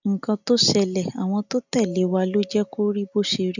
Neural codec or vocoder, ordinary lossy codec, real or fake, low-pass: none; none; real; 7.2 kHz